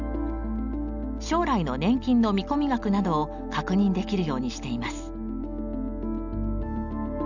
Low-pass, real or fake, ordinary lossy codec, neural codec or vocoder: 7.2 kHz; real; none; none